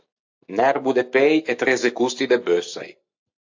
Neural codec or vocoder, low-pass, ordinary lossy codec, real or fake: none; 7.2 kHz; AAC, 48 kbps; real